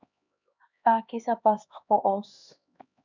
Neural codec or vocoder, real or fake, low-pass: codec, 16 kHz, 2 kbps, X-Codec, HuBERT features, trained on LibriSpeech; fake; 7.2 kHz